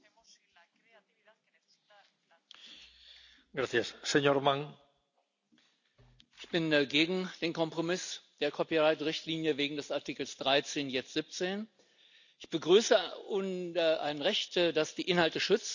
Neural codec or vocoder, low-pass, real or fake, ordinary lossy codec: none; 7.2 kHz; real; none